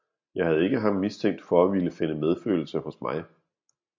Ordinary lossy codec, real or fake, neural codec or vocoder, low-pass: MP3, 64 kbps; real; none; 7.2 kHz